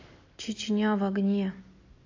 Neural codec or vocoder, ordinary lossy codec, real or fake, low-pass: none; AAC, 32 kbps; real; 7.2 kHz